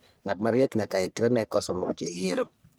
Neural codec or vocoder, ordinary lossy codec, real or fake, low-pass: codec, 44.1 kHz, 1.7 kbps, Pupu-Codec; none; fake; none